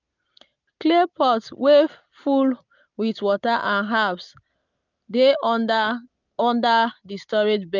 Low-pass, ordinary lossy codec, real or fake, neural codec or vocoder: 7.2 kHz; none; real; none